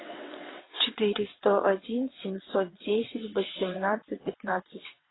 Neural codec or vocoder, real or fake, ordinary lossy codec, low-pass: vocoder, 22.05 kHz, 80 mel bands, WaveNeXt; fake; AAC, 16 kbps; 7.2 kHz